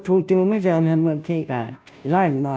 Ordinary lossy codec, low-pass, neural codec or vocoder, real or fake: none; none; codec, 16 kHz, 0.5 kbps, FunCodec, trained on Chinese and English, 25 frames a second; fake